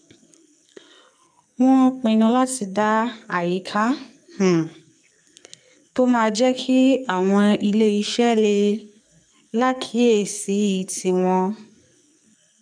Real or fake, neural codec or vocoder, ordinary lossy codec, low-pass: fake; codec, 44.1 kHz, 2.6 kbps, SNAC; none; 9.9 kHz